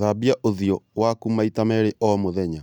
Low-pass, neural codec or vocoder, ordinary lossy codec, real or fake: 19.8 kHz; none; none; real